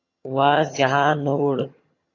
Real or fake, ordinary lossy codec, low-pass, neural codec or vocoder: fake; AAC, 32 kbps; 7.2 kHz; vocoder, 22.05 kHz, 80 mel bands, HiFi-GAN